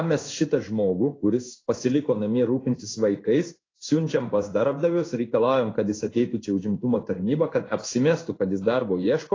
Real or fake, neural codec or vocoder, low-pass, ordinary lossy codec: fake; codec, 16 kHz in and 24 kHz out, 1 kbps, XY-Tokenizer; 7.2 kHz; AAC, 32 kbps